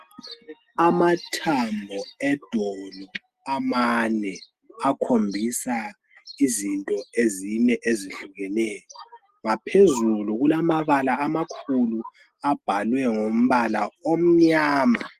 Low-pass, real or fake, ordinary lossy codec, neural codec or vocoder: 14.4 kHz; real; Opus, 24 kbps; none